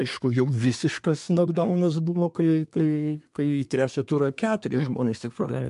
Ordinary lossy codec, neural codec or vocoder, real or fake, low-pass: MP3, 64 kbps; codec, 24 kHz, 1 kbps, SNAC; fake; 10.8 kHz